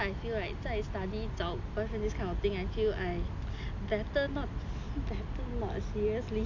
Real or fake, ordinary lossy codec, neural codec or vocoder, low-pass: real; AAC, 48 kbps; none; 7.2 kHz